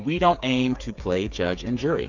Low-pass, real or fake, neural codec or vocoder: 7.2 kHz; fake; codec, 16 kHz, 8 kbps, FreqCodec, smaller model